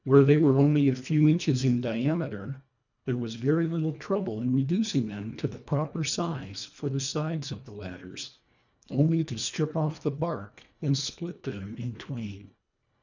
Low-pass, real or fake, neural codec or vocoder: 7.2 kHz; fake; codec, 24 kHz, 1.5 kbps, HILCodec